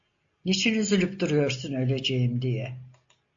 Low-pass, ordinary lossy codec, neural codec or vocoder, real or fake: 7.2 kHz; AAC, 64 kbps; none; real